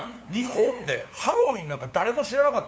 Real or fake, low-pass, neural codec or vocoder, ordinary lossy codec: fake; none; codec, 16 kHz, 2 kbps, FunCodec, trained on LibriTTS, 25 frames a second; none